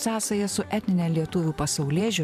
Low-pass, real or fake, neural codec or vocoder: 14.4 kHz; fake; vocoder, 44.1 kHz, 128 mel bands every 512 samples, BigVGAN v2